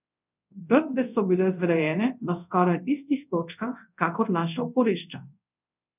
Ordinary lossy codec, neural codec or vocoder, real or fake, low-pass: none; codec, 24 kHz, 0.5 kbps, DualCodec; fake; 3.6 kHz